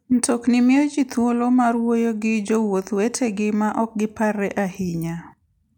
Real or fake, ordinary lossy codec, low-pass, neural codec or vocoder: real; none; 19.8 kHz; none